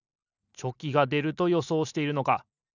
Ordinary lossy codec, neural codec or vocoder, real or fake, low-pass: none; none; real; 7.2 kHz